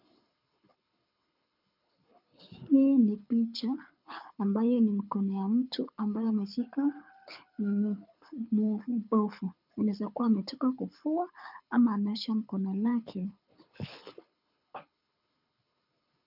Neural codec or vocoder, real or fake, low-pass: codec, 24 kHz, 6 kbps, HILCodec; fake; 5.4 kHz